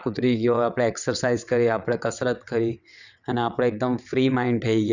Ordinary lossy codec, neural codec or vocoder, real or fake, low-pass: none; vocoder, 22.05 kHz, 80 mel bands, WaveNeXt; fake; 7.2 kHz